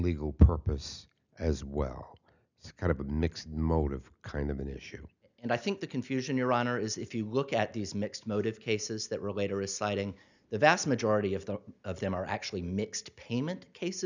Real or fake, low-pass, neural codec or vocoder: real; 7.2 kHz; none